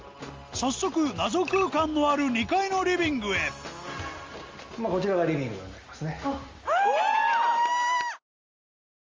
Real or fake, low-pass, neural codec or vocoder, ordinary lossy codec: real; 7.2 kHz; none; Opus, 32 kbps